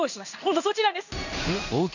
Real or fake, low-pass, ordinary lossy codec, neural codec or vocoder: fake; 7.2 kHz; none; codec, 16 kHz in and 24 kHz out, 1 kbps, XY-Tokenizer